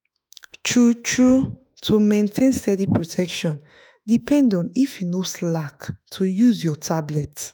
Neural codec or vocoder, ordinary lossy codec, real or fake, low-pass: autoencoder, 48 kHz, 32 numbers a frame, DAC-VAE, trained on Japanese speech; none; fake; none